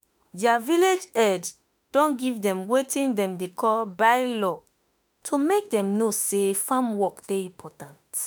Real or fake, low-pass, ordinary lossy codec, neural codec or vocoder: fake; none; none; autoencoder, 48 kHz, 32 numbers a frame, DAC-VAE, trained on Japanese speech